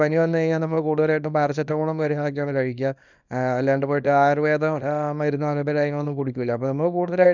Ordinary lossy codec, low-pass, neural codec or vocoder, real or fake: none; 7.2 kHz; codec, 24 kHz, 0.9 kbps, WavTokenizer, small release; fake